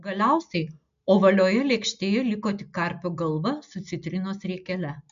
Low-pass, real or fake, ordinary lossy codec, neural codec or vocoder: 7.2 kHz; real; MP3, 64 kbps; none